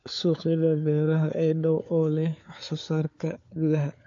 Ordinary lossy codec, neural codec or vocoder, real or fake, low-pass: AAC, 48 kbps; codec, 16 kHz, 4 kbps, FunCodec, trained on LibriTTS, 50 frames a second; fake; 7.2 kHz